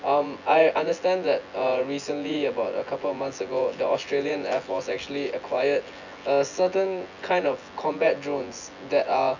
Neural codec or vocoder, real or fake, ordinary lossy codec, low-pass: vocoder, 24 kHz, 100 mel bands, Vocos; fake; none; 7.2 kHz